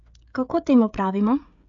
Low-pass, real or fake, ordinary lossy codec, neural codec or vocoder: 7.2 kHz; fake; none; codec, 16 kHz, 16 kbps, FreqCodec, smaller model